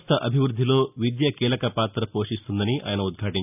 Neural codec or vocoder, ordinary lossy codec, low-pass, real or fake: none; none; 3.6 kHz; real